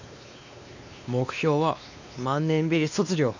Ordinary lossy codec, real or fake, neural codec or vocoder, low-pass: none; fake; codec, 16 kHz, 2 kbps, X-Codec, WavLM features, trained on Multilingual LibriSpeech; 7.2 kHz